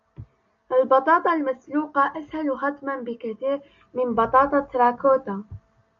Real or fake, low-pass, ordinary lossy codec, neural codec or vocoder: real; 7.2 kHz; MP3, 96 kbps; none